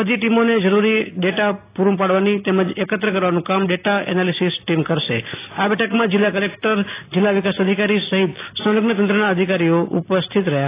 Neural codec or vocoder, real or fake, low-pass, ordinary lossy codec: none; real; 3.6 kHz; AAC, 24 kbps